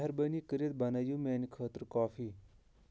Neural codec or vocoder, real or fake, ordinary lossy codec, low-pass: none; real; none; none